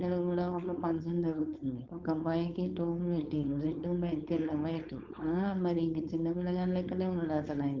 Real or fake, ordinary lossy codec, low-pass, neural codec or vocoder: fake; Opus, 32 kbps; 7.2 kHz; codec, 16 kHz, 4.8 kbps, FACodec